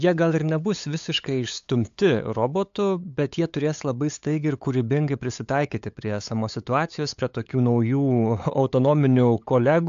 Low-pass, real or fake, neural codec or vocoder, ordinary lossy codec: 7.2 kHz; fake; codec, 16 kHz, 8 kbps, FunCodec, trained on LibriTTS, 25 frames a second; AAC, 64 kbps